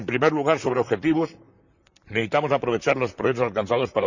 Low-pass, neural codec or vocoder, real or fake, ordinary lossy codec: 7.2 kHz; vocoder, 44.1 kHz, 128 mel bands, Pupu-Vocoder; fake; none